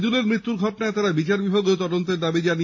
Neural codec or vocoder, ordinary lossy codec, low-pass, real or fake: none; MP3, 32 kbps; 7.2 kHz; real